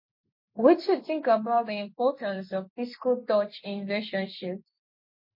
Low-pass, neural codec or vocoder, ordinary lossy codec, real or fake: 5.4 kHz; none; MP3, 32 kbps; real